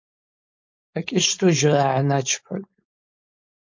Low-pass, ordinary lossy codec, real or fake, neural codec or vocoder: 7.2 kHz; MP3, 48 kbps; fake; codec, 16 kHz, 4.8 kbps, FACodec